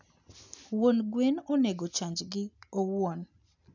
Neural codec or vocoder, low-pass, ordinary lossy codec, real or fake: none; 7.2 kHz; none; real